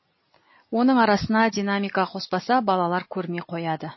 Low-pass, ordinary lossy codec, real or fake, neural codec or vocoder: 7.2 kHz; MP3, 24 kbps; real; none